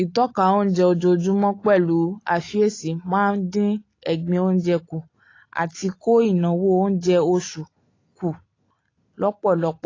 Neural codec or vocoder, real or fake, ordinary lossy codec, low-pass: none; real; AAC, 32 kbps; 7.2 kHz